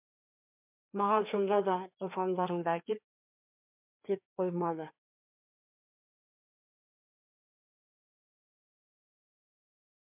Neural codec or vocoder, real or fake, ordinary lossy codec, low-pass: codec, 16 kHz, 2 kbps, FreqCodec, larger model; fake; MP3, 32 kbps; 3.6 kHz